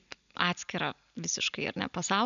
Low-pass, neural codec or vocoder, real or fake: 7.2 kHz; none; real